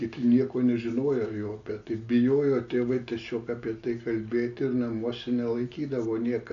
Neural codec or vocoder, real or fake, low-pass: none; real; 7.2 kHz